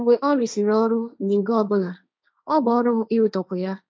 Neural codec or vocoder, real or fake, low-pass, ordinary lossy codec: codec, 16 kHz, 1.1 kbps, Voila-Tokenizer; fake; none; none